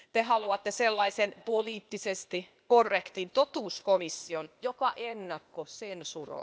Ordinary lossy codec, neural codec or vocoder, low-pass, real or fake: none; codec, 16 kHz, 0.8 kbps, ZipCodec; none; fake